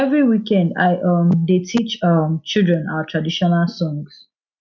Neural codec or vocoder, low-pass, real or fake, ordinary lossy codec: none; 7.2 kHz; real; none